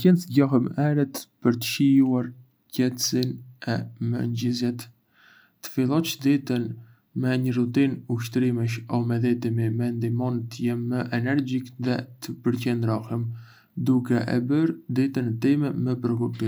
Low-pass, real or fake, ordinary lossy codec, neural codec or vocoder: none; real; none; none